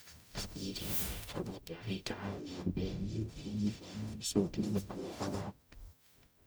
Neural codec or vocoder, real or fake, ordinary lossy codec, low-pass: codec, 44.1 kHz, 0.9 kbps, DAC; fake; none; none